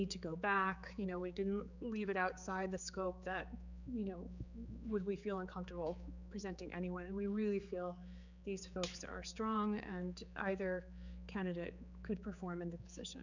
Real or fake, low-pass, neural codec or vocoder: fake; 7.2 kHz; codec, 16 kHz, 4 kbps, X-Codec, HuBERT features, trained on general audio